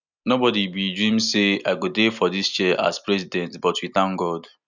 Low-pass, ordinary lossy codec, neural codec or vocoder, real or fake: 7.2 kHz; none; none; real